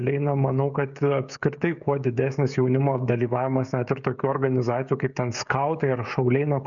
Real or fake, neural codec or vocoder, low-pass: fake; codec, 16 kHz, 16 kbps, FreqCodec, smaller model; 7.2 kHz